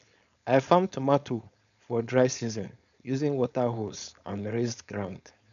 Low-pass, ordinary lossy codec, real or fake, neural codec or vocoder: 7.2 kHz; none; fake; codec, 16 kHz, 4.8 kbps, FACodec